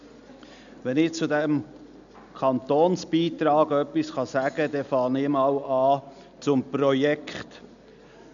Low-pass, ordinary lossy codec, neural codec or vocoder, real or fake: 7.2 kHz; none; none; real